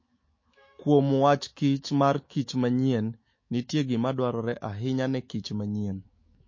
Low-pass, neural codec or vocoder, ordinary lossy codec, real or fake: 7.2 kHz; autoencoder, 48 kHz, 128 numbers a frame, DAC-VAE, trained on Japanese speech; MP3, 32 kbps; fake